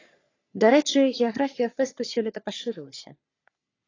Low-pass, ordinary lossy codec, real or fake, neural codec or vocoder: 7.2 kHz; AAC, 32 kbps; fake; codec, 44.1 kHz, 3.4 kbps, Pupu-Codec